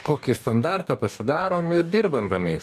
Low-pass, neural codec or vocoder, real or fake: 14.4 kHz; codec, 44.1 kHz, 2.6 kbps, DAC; fake